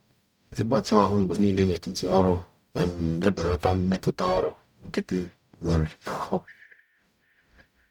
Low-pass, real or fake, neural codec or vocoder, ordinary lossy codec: 19.8 kHz; fake; codec, 44.1 kHz, 0.9 kbps, DAC; none